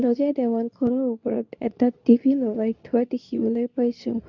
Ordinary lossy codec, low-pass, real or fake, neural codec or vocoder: none; 7.2 kHz; fake; codec, 24 kHz, 0.9 kbps, WavTokenizer, medium speech release version 2